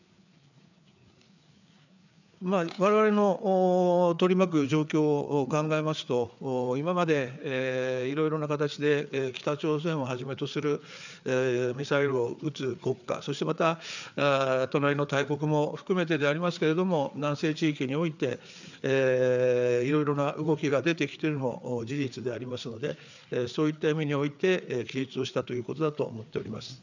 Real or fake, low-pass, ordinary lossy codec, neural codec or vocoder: fake; 7.2 kHz; none; codec, 16 kHz, 4 kbps, FreqCodec, larger model